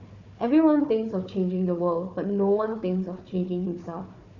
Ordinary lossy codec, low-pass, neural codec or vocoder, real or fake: none; 7.2 kHz; codec, 16 kHz, 4 kbps, FunCodec, trained on Chinese and English, 50 frames a second; fake